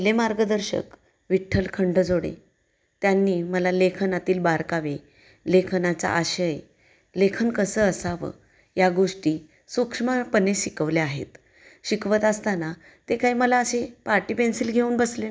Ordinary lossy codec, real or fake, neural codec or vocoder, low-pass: none; real; none; none